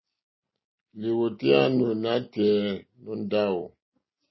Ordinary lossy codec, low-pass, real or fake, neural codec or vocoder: MP3, 24 kbps; 7.2 kHz; real; none